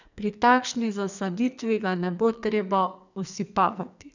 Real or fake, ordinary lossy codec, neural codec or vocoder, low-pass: fake; none; codec, 44.1 kHz, 2.6 kbps, SNAC; 7.2 kHz